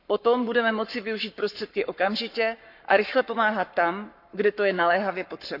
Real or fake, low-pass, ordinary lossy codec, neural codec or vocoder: fake; 5.4 kHz; none; codec, 44.1 kHz, 7.8 kbps, Pupu-Codec